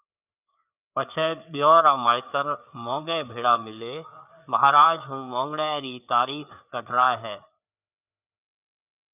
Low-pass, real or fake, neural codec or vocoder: 3.6 kHz; fake; codec, 16 kHz, 4 kbps, FreqCodec, larger model